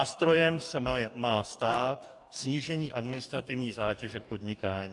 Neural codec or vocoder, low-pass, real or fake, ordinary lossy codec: codec, 44.1 kHz, 2.6 kbps, DAC; 10.8 kHz; fake; AAC, 64 kbps